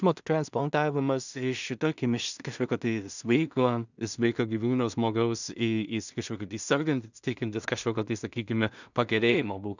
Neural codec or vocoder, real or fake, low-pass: codec, 16 kHz in and 24 kHz out, 0.4 kbps, LongCat-Audio-Codec, two codebook decoder; fake; 7.2 kHz